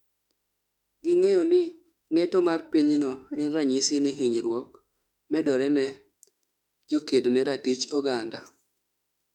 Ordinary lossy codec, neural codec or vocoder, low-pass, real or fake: none; autoencoder, 48 kHz, 32 numbers a frame, DAC-VAE, trained on Japanese speech; 19.8 kHz; fake